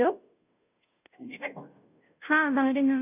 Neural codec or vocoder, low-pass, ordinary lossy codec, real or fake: codec, 16 kHz, 0.5 kbps, FunCodec, trained on Chinese and English, 25 frames a second; 3.6 kHz; none; fake